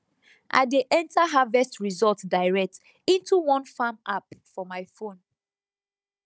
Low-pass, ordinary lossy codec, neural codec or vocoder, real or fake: none; none; codec, 16 kHz, 16 kbps, FunCodec, trained on Chinese and English, 50 frames a second; fake